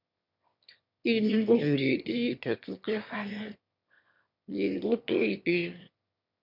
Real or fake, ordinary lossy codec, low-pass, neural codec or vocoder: fake; MP3, 48 kbps; 5.4 kHz; autoencoder, 22.05 kHz, a latent of 192 numbers a frame, VITS, trained on one speaker